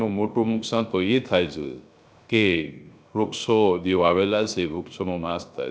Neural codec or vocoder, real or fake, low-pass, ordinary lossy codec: codec, 16 kHz, 0.3 kbps, FocalCodec; fake; none; none